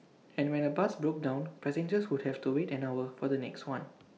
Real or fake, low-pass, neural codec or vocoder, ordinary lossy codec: real; none; none; none